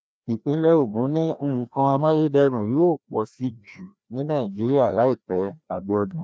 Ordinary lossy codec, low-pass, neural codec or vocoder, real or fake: none; none; codec, 16 kHz, 1 kbps, FreqCodec, larger model; fake